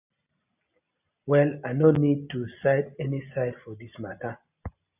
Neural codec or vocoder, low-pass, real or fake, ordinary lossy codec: none; 3.6 kHz; real; AAC, 32 kbps